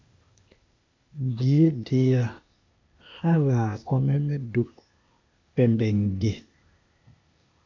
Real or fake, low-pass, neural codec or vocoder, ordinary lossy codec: fake; 7.2 kHz; codec, 16 kHz, 0.8 kbps, ZipCodec; MP3, 64 kbps